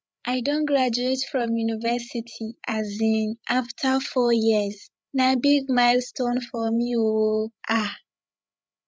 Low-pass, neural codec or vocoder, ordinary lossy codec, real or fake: none; codec, 16 kHz, 8 kbps, FreqCodec, larger model; none; fake